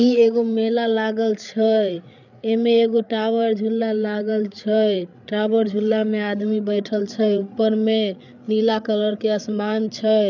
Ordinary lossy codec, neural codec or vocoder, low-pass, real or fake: none; codec, 16 kHz, 8 kbps, FreqCodec, larger model; 7.2 kHz; fake